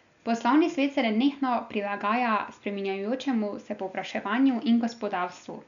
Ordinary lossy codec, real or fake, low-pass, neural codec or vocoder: none; real; 7.2 kHz; none